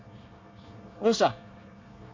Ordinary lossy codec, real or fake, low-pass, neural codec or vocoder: MP3, 64 kbps; fake; 7.2 kHz; codec, 24 kHz, 1 kbps, SNAC